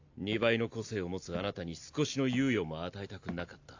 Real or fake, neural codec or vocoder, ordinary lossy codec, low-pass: real; none; AAC, 48 kbps; 7.2 kHz